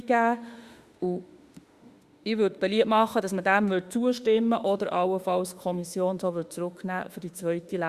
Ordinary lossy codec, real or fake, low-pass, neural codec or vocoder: Opus, 64 kbps; fake; 14.4 kHz; autoencoder, 48 kHz, 32 numbers a frame, DAC-VAE, trained on Japanese speech